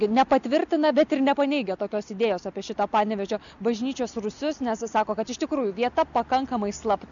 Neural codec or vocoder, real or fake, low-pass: none; real; 7.2 kHz